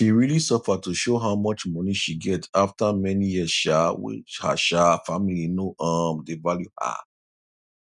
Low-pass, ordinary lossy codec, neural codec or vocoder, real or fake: 10.8 kHz; none; none; real